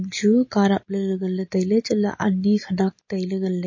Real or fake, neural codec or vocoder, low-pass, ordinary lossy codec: real; none; 7.2 kHz; MP3, 32 kbps